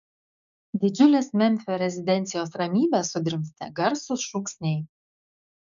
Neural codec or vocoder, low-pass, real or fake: codec, 16 kHz, 6 kbps, DAC; 7.2 kHz; fake